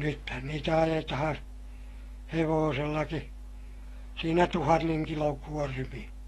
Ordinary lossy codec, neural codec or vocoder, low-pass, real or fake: AAC, 32 kbps; autoencoder, 48 kHz, 128 numbers a frame, DAC-VAE, trained on Japanese speech; 19.8 kHz; fake